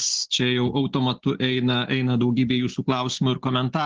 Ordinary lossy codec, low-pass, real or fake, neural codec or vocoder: Opus, 16 kbps; 7.2 kHz; real; none